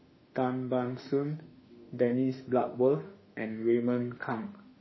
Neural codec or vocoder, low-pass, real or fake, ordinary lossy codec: autoencoder, 48 kHz, 32 numbers a frame, DAC-VAE, trained on Japanese speech; 7.2 kHz; fake; MP3, 24 kbps